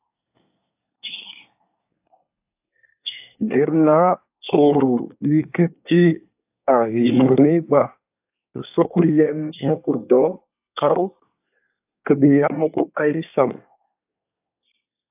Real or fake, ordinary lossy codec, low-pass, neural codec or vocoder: fake; AAC, 32 kbps; 3.6 kHz; codec, 24 kHz, 1 kbps, SNAC